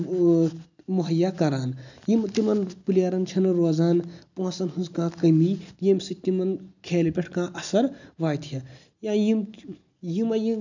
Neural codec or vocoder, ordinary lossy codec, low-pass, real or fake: none; none; 7.2 kHz; real